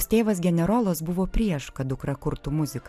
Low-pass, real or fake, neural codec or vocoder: 14.4 kHz; real; none